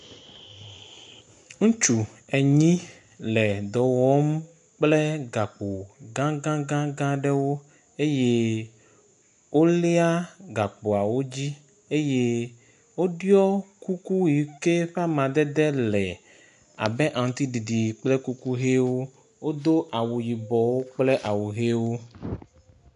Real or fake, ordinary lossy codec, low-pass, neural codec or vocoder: real; MP3, 64 kbps; 10.8 kHz; none